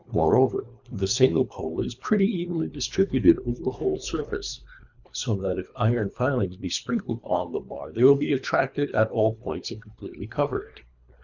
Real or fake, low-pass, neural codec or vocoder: fake; 7.2 kHz; codec, 24 kHz, 3 kbps, HILCodec